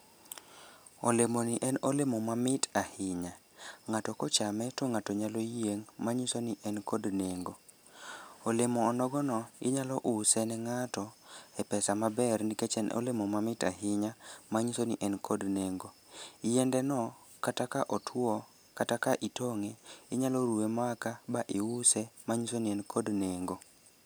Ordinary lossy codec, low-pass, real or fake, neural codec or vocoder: none; none; real; none